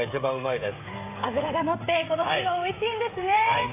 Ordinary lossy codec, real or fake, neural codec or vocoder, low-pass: AAC, 16 kbps; fake; codec, 16 kHz, 16 kbps, FreqCodec, smaller model; 3.6 kHz